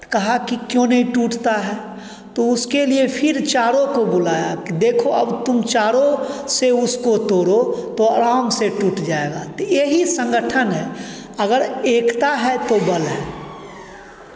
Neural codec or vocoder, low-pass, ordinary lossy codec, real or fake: none; none; none; real